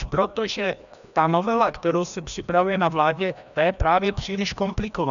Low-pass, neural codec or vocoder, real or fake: 7.2 kHz; codec, 16 kHz, 1 kbps, FreqCodec, larger model; fake